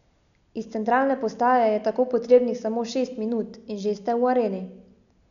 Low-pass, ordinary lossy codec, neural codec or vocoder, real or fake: 7.2 kHz; none; none; real